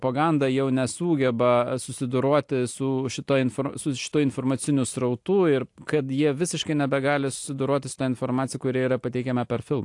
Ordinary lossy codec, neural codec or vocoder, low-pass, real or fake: AAC, 64 kbps; none; 10.8 kHz; real